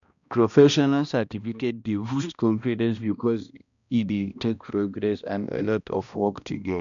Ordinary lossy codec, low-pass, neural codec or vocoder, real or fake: none; 7.2 kHz; codec, 16 kHz, 1 kbps, X-Codec, HuBERT features, trained on balanced general audio; fake